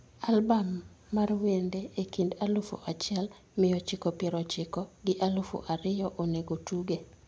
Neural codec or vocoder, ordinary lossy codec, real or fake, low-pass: none; none; real; none